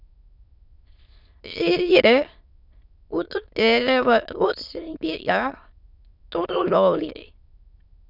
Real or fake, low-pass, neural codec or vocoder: fake; 5.4 kHz; autoencoder, 22.05 kHz, a latent of 192 numbers a frame, VITS, trained on many speakers